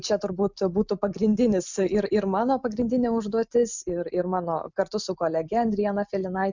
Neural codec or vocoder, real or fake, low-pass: none; real; 7.2 kHz